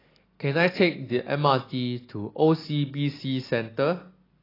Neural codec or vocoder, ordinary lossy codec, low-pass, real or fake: none; AAC, 24 kbps; 5.4 kHz; real